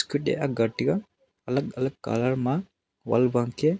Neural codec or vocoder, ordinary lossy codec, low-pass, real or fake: none; none; none; real